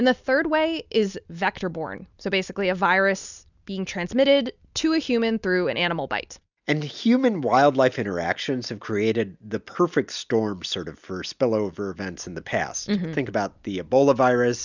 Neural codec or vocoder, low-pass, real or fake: none; 7.2 kHz; real